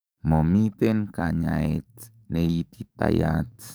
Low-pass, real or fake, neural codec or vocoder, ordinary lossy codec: none; fake; codec, 44.1 kHz, 7.8 kbps, DAC; none